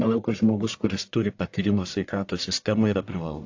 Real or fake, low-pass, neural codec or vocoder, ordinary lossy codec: fake; 7.2 kHz; codec, 44.1 kHz, 1.7 kbps, Pupu-Codec; AAC, 48 kbps